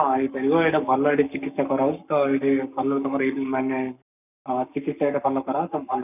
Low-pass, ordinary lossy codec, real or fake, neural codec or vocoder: 3.6 kHz; none; fake; codec, 44.1 kHz, 7.8 kbps, Pupu-Codec